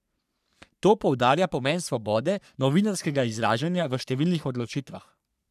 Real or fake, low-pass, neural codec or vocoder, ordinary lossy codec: fake; 14.4 kHz; codec, 44.1 kHz, 3.4 kbps, Pupu-Codec; none